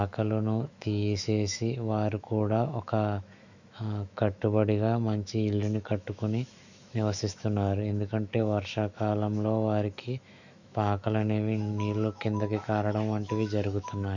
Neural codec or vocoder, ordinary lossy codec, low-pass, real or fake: autoencoder, 48 kHz, 128 numbers a frame, DAC-VAE, trained on Japanese speech; none; 7.2 kHz; fake